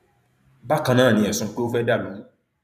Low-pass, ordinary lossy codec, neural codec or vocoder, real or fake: 14.4 kHz; none; vocoder, 44.1 kHz, 128 mel bands every 512 samples, BigVGAN v2; fake